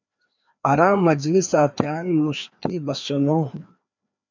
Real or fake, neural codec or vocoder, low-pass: fake; codec, 16 kHz, 2 kbps, FreqCodec, larger model; 7.2 kHz